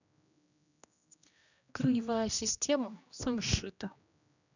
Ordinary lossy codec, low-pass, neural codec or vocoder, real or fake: none; 7.2 kHz; codec, 16 kHz, 1 kbps, X-Codec, HuBERT features, trained on general audio; fake